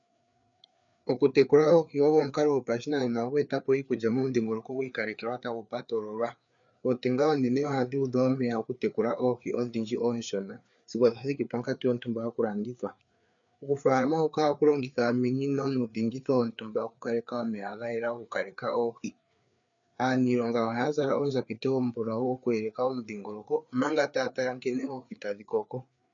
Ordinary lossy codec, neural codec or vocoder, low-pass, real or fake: MP3, 96 kbps; codec, 16 kHz, 4 kbps, FreqCodec, larger model; 7.2 kHz; fake